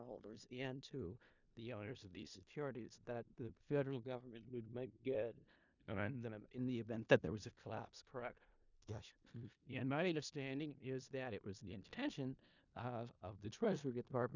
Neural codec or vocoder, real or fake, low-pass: codec, 16 kHz in and 24 kHz out, 0.4 kbps, LongCat-Audio-Codec, four codebook decoder; fake; 7.2 kHz